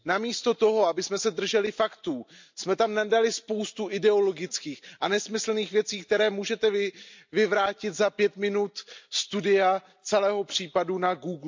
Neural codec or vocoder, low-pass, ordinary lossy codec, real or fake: none; 7.2 kHz; MP3, 64 kbps; real